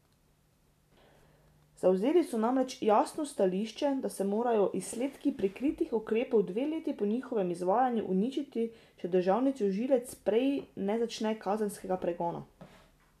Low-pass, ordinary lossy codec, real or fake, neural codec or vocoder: 14.4 kHz; none; real; none